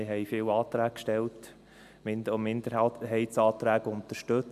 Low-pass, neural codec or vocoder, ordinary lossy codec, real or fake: 14.4 kHz; none; MP3, 96 kbps; real